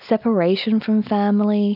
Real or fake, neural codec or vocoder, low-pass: real; none; 5.4 kHz